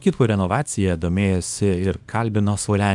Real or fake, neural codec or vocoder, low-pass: fake; codec, 24 kHz, 0.9 kbps, WavTokenizer, small release; 10.8 kHz